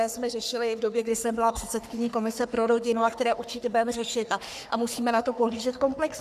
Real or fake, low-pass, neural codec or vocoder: fake; 14.4 kHz; codec, 44.1 kHz, 3.4 kbps, Pupu-Codec